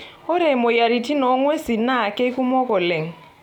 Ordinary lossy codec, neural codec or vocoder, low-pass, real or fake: none; none; 19.8 kHz; real